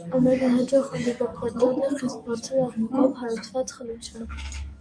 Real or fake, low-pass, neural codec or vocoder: fake; 9.9 kHz; codec, 44.1 kHz, 7.8 kbps, DAC